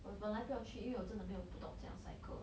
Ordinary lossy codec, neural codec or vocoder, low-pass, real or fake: none; none; none; real